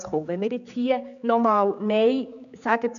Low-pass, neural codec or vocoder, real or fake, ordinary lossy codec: 7.2 kHz; codec, 16 kHz, 4 kbps, X-Codec, HuBERT features, trained on general audio; fake; none